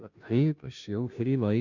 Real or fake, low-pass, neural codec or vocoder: fake; 7.2 kHz; codec, 16 kHz, 0.5 kbps, FunCodec, trained on Chinese and English, 25 frames a second